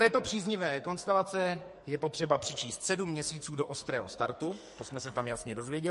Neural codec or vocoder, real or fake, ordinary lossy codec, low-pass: codec, 44.1 kHz, 2.6 kbps, SNAC; fake; MP3, 48 kbps; 14.4 kHz